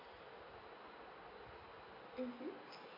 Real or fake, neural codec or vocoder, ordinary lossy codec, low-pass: real; none; MP3, 32 kbps; 5.4 kHz